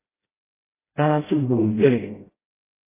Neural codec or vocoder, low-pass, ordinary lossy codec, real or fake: codec, 16 kHz, 0.5 kbps, FreqCodec, smaller model; 3.6 kHz; AAC, 16 kbps; fake